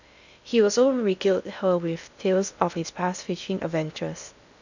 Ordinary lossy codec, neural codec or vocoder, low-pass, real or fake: none; codec, 16 kHz in and 24 kHz out, 0.6 kbps, FocalCodec, streaming, 2048 codes; 7.2 kHz; fake